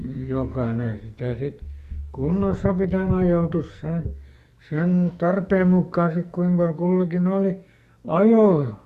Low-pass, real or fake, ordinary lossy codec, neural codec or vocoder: 14.4 kHz; fake; Opus, 64 kbps; codec, 44.1 kHz, 2.6 kbps, SNAC